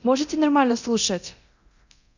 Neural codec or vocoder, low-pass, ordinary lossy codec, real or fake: codec, 24 kHz, 0.9 kbps, DualCodec; 7.2 kHz; none; fake